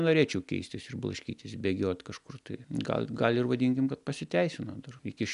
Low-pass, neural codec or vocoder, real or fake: 10.8 kHz; none; real